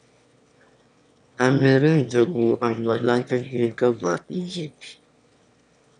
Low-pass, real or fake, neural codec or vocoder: 9.9 kHz; fake; autoencoder, 22.05 kHz, a latent of 192 numbers a frame, VITS, trained on one speaker